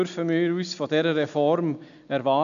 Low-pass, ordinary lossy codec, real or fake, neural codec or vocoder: 7.2 kHz; none; real; none